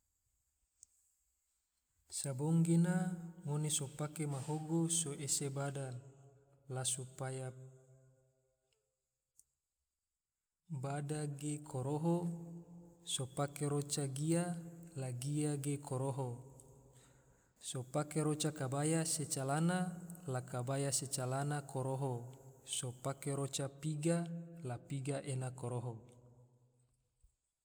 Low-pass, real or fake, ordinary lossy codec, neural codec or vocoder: none; real; none; none